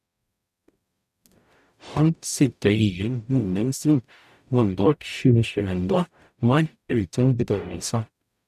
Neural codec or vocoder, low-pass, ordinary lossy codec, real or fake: codec, 44.1 kHz, 0.9 kbps, DAC; 14.4 kHz; none; fake